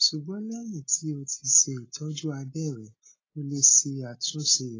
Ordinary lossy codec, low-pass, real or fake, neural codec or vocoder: AAC, 32 kbps; 7.2 kHz; real; none